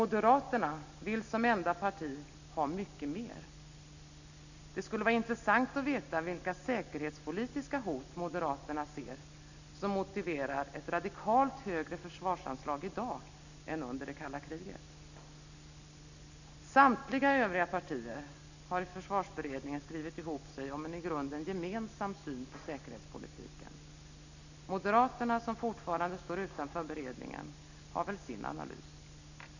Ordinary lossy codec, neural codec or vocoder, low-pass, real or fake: none; none; 7.2 kHz; real